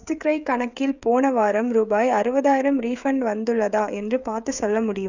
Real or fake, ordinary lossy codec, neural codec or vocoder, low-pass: fake; none; codec, 16 kHz, 16 kbps, FreqCodec, smaller model; 7.2 kHz